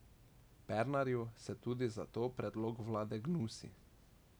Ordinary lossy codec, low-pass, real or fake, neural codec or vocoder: none; none; real; none